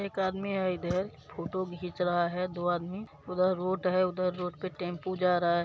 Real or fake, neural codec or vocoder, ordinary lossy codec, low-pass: real; none; none; none